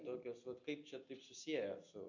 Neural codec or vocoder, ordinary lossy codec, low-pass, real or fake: none; MP3, 48 kbps; 7.2 kHz; real